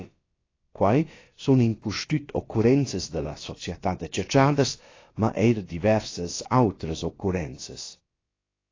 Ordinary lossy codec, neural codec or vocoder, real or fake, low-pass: AAC, 32 kbps; codec, 16 kHz, about 1 kbps, DyCAST, with the encoder's durations; fake; 7.2 kHz